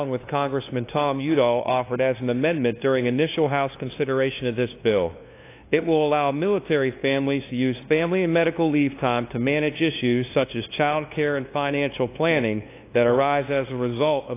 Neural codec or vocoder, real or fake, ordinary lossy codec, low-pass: codec, 16 kHz, 2 kbps, FunCodec, trained on LibriTTS, 25 frames a second; fake; AAC, 24 kbps; 3.6 kHz